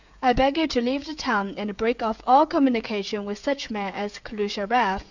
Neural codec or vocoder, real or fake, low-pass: codec, 16 kHz, 16 kbps, FreqCodec, smaller model; fake; 7.2 kHz